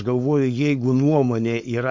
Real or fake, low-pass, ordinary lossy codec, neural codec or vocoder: fake; 7.2 kHz; MP3, 48 kbps; codec, 16 kHz, 4.8 kbps, FACodec